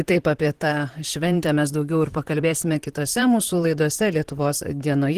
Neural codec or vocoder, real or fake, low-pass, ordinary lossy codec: vocoder, 44.1 kHz, 128 mel bands, Pupu-Vocoder; fake; 14.4 kHz; Opus, 16 kbps